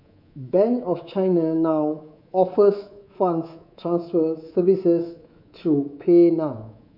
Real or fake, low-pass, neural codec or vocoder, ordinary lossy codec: fake; 5.4 kHz; codec, 24 kHz, 3.1 kbps, DualCodec; none